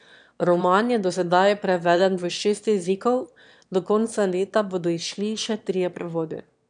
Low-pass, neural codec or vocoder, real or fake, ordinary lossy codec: 9.9 kHz; autoencoder, 22.05 kHz, a latent of 192 numbers a frame, VITS, trained on one speaker; fake; none